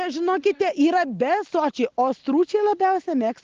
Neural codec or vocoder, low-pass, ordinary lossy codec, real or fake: none; 7.2 kHz; Opus, 16 kbps; real